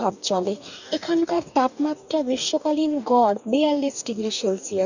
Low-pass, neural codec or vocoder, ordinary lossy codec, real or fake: 7.2 kHz; codec, 44.1 kHz, 2.6 kbps, DAC; none; fake